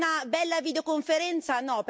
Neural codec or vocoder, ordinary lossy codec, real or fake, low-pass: none; none; real; none